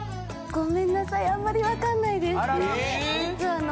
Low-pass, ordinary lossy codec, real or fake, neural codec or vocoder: none; none; real; none